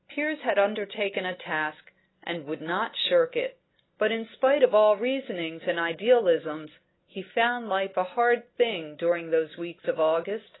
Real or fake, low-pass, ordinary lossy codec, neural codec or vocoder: real; 7.2 kHz; AAC, 16 kbps; none